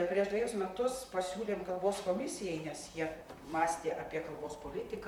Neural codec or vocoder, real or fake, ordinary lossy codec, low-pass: vocoder, 44.1 kHz, 128 mel bands, Pupu-Vocoder; fake; MP3, 96 kbps; 19.8 kHz